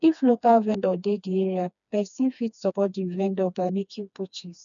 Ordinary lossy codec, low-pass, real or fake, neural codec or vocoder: none; 7.2 kHz; fake; codec, 16 kHz, 2 kbps, FreqCodec, smaller model